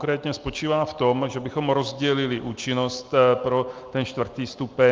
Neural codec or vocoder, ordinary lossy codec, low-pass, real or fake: none; Opus, 24 kbps; 7.2 kHz; real